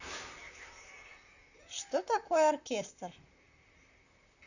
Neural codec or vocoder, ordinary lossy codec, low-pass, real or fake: vocoder, 44.1 kHz, 128 mel bands, Pupu-Vocoder; none; 7.2 kHz; fake